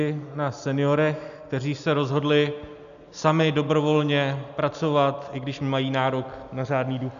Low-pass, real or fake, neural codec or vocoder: 7.2 kHz; real; none